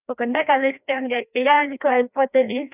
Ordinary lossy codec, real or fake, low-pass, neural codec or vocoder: none; fake; 3.6 kHz; codec, 16 kHz, 1 kbps, FreqCodec, larger model